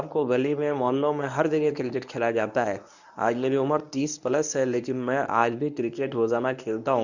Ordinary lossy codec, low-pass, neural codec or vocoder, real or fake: none; 7.2 kHz; codec, 24 kHz, 0.9 kbps, WavTokenizer, medium speech release version 1; fake